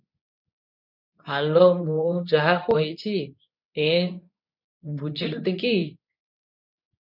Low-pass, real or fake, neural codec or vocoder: 5.4 kHz; fake; codec, 24 kHz, 0.9 kbps, WavTokenizer, medium speech release version 2